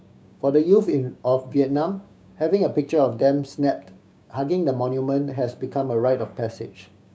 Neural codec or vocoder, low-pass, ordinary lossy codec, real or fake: codec, 16 kHz, 6 kbps, DAC; none; none; fake